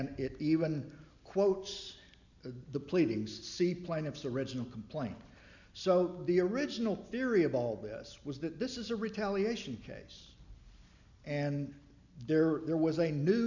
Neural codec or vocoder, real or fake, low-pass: none; real; 7.2 kHz